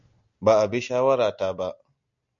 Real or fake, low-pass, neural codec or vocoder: real; 7.2 kHz; none